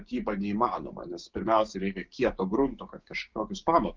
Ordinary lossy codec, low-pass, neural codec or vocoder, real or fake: Opus, 32 kbps; 7.2 kHz; codec, 16 kHz, 6 kbps, DAC; fake